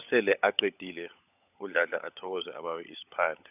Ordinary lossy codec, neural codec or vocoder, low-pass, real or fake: none; codec, 16 kHz, 16 kbps, FunCodec, trained on LibriTTS, 50 frames a second; 3.6 kHz; fake